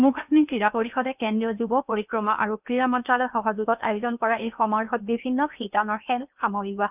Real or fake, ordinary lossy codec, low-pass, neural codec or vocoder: fake; none; 3.6 kHz; codec, 16 kHz in and 24 kHz out, 0.8 kbps, FocalCodec, streaming, 65536 codes